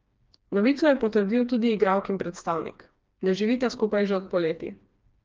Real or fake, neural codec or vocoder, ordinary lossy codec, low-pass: fake; codec, 16 kHz, 2 kbps, FreqCodec, smaller model; Opus, 24 kbps; 7.2 kHz